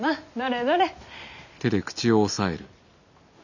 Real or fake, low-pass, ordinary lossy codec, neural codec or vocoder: real; 7.2 kHz; none; none